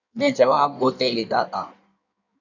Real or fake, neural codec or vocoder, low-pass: fake; codec, 16 kHz in and 24 kHz out, 1.1 kbps, FireRedTTS-2 codec; 7.2 kHz